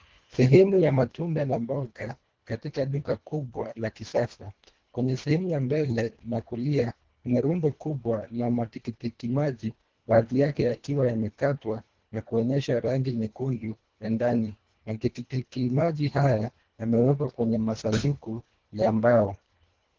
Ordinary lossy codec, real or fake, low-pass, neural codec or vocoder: Opus, 16 kbps; fake; 7.2 kHz; codec, 24 kHz, 1.5 kbps, HILCodec